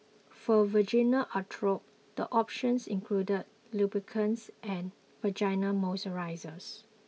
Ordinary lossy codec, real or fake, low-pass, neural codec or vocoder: none; real; none; none